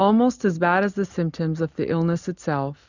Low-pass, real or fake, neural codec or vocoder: 7.2 kHz; real; none